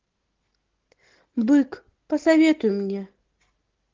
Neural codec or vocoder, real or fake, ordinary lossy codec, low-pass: none; real; Opus, 16 kbps; 7.2 kHz